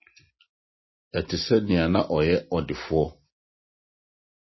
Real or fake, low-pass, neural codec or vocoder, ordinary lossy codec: real; 7.2 kHz; none; MP3, 24 kbps